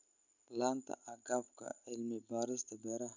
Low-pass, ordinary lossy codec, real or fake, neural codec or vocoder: 7.2 kHz; none; real; none